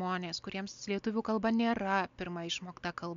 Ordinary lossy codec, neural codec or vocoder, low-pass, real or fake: MP3, 64 kbps; none; 7.2 kHz; real